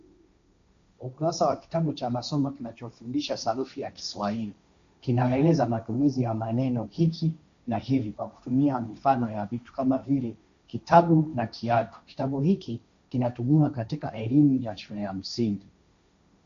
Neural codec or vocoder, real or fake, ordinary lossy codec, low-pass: codec, 16 kHz, 1.1 kbps, Voila-Tokenizer; fake; AAC, 64 kbps; 7.2 kHz